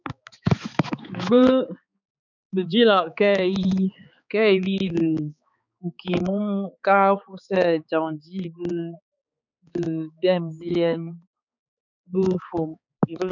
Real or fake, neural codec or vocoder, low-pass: fake; codec, 16 kHz, 4 kbps, X-Codec, HuBERT features, trained on balanced general audio; 7.2 kHz